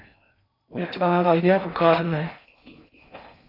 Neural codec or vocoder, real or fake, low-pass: codec, 16 kHz in and 24 kHz out, 0.6 kbps, FocalCodec, streaming, 4096 codes; fake; 5.4 kHz